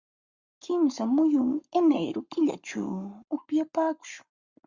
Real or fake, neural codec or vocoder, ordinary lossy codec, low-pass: fake; codec, 44.1 kHz, 7.8 kbps, Pupu-Codec; Opus, 64 kbps; 7.2 kHz